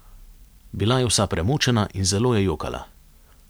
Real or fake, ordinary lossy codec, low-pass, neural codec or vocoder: real; none; none; none